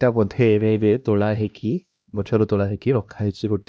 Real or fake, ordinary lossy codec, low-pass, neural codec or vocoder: fake; none; none; codec, 16 kHz, 1 kbps, X-Codec, HuBERT features, trained on LibriSpeech